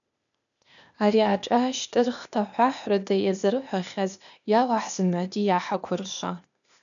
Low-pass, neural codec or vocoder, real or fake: 7.2 kHz; codec, 16 kHz, 0.8 kbps, ZipCodec; fake